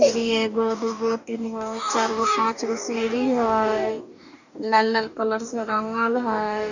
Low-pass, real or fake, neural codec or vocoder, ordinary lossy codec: 7.2 kHz; fake; codec, 44.1 kHz, 2.6 kbps, DAC; none